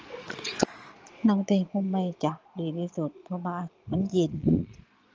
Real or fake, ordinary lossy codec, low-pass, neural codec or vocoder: fake; Opus, 24 kbps; 7.2 kHz; vocoder, 22.05 kHz, 80 mel bands, Vocos